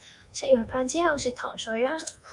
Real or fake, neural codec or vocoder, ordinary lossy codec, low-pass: fake; codec, 24 kHz, 1.2 kbps, DualCodec; MP3, 96 kbps; 10.8 kHz